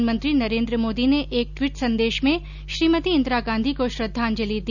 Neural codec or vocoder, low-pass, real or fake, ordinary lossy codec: none; 7.2 kHz; real; none